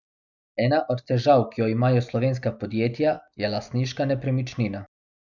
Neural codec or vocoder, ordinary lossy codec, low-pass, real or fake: none; none; 7.2 kHz; real